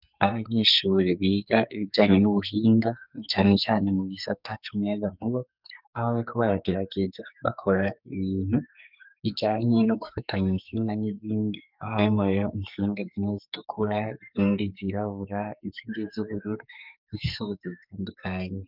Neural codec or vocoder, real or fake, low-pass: codec, 44.1 kHz, 2.6 kbps, SNAC; fake; 5.4 kHz